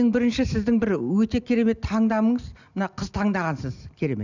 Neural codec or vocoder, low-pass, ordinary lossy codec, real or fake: none; 7.2 kHz; none; real